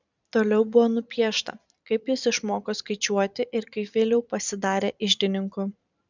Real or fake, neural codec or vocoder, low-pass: fake; vocoder, 44.1 kHz, 128 mel bands every 256 samples, BigVGAN v2; 7.2 kHz